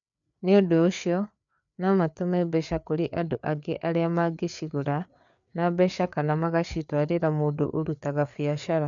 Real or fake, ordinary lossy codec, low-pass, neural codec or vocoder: fake; none; 7.2 kHz; codec, 16 kHz, 4 kbps, FreqCodec, larger model